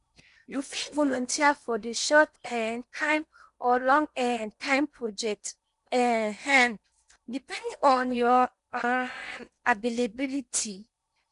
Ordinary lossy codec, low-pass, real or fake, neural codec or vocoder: none; 10.8 kHz; fake; codec, 16 kHz in and 24 kHz out, 0.8 kbps, FocalCodec, streaming, 65536 codes